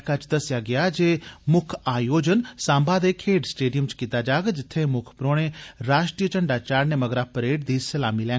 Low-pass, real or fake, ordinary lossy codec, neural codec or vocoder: none; real; none; none